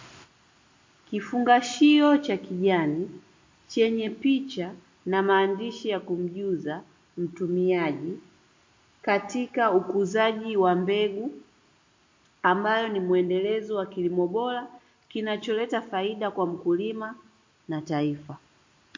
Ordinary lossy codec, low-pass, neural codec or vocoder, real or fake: MP3, 48 kbps; 7.2 kHz; none; real